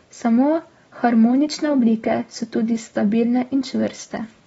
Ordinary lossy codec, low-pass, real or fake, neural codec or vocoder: AAC, 24 kbps; 10.8 kHz; real; none